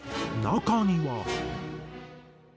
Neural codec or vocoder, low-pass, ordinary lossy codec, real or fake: none; none; none; real